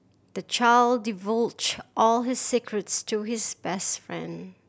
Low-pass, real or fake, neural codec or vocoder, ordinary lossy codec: none; real; none; none